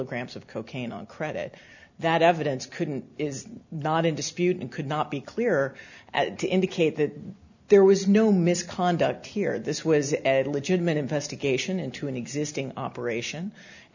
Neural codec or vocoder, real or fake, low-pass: none; real; 7.2 kHz